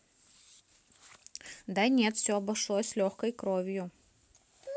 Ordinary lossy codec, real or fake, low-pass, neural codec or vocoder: none; real; none; none